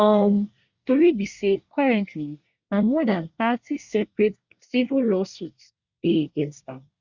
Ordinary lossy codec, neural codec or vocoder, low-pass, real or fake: Opus, 64 kbps; codec, 24 kHz, 1 kbps, SNAC; 7.2 kHz; fake